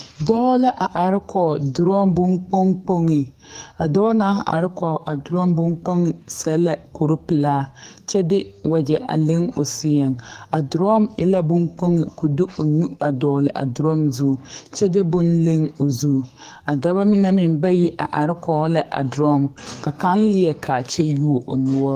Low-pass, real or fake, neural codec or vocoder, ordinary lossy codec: 14.4 kHz; fake; codec, 44.1 kHz, 2.6 kbps, SNAC; Opus, 32 kbps